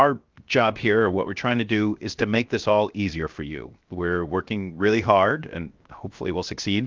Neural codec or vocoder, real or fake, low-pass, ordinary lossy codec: codec, 16 kHz, 0.7 kbps, FocalCodec; fake; 7.2 kHz; Opus, 24 kbps